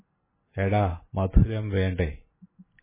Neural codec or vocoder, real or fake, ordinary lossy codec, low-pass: none; real; MP3, 16 kbps; 3.6 kHz